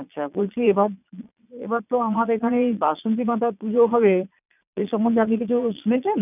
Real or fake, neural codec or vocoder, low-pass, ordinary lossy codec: fake; vocoder, 22.05 kHz, 80 mel bands, Vocos; 3.6 kHz; none